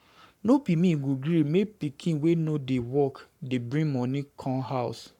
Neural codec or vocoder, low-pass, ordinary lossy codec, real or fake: codec, 44.1 kHz, 7.8 kbps, Pupu-Codec; 19.8 kHz; none; fake